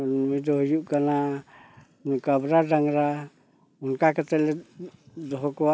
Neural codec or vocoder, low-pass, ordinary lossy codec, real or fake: none; none; none; real